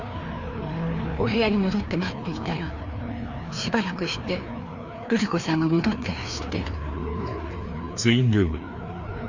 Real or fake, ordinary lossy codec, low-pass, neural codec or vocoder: fake; Opus, 64 kbps; 7.2 kHz; codec, 16 kHz, 2 kbps, FreqCodec, larger model